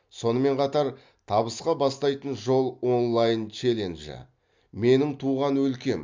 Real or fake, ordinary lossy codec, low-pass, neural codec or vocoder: real; MP3, 64 kbps; 7.2 kHz; none